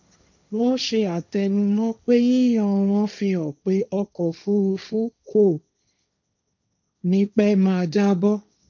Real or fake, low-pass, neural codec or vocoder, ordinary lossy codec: fake; 7.2 kHz; codec, 16 kHz, 1.1 kbps, Voila-Tokenizer; none